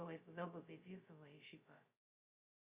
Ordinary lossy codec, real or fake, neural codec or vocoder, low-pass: Opus, 64 kbps; fake; codec, 16 kHz, 0.2 kbps, FocalCodec; 3.6 kHz